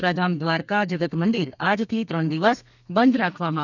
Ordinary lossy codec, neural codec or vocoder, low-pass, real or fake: none; codec, 32 kHz, 1.9 kbps, SNAC; 7.2 kHz; fake